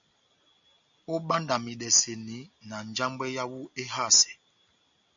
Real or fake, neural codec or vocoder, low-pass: real; none; 7.2 kHz